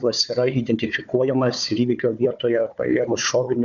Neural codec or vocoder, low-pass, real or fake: codec, 16 kHz, 4 kbps, FunCodec, trained on Chinese and English, 50 frames a second; 7.2 kHz; fake